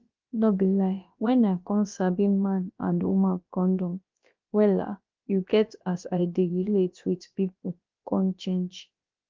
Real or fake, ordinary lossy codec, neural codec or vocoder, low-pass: fake; Opus, 24 kbps; codec, 16 kHz, about 1 kbps, DyCAST, with the encoder's durations; 7.2 kHz